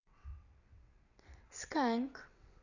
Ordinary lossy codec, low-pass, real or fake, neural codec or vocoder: none; 7.2 kHz; fake; vocoder, 22.05 kHz, 80 mel bands, WaveNeXt